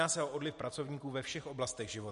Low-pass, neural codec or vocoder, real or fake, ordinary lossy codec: 10.8 kHz; none; real; MP3, 48 kbps